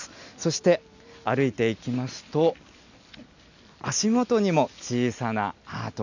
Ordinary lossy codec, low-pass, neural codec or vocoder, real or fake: none; 7.2 kHz; none; real